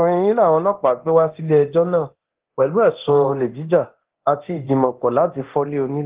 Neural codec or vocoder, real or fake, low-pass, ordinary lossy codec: codec, 24 kHz, 0.9 kbps, DualCodec; fake; 3.6 kHz; Opus, 16 kbps